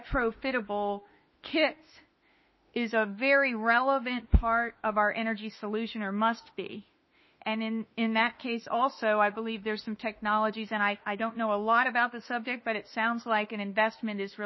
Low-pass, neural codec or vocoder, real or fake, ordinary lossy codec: 7.2 kHz; autoencoder, 48 kHz, 32 numbers a frame, DAC-VAE, trained on Japanese speech; fake; MP3, 24 kbps